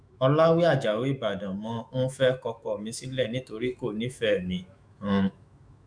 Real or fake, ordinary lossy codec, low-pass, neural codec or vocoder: fake; none; 9.9 kHz; autoencoder, 48 kHz, 128 numbers a frame, DAC-VAE, trained on Japanese speech